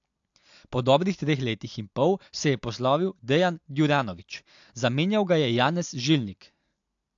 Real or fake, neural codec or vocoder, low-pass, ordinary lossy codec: real; none; 7.2 kHz; AAC, 64 kbps